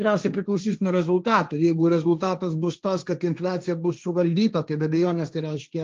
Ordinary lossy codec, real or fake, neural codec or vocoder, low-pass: Opus, 32 kbps; fake; codec, 16 kHz, 1.1 kbps, Voila-Tokenizer; 7.2 kHz